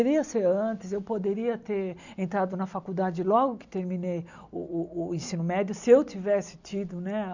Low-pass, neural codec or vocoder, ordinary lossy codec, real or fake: 7.2 kHz; none; none; real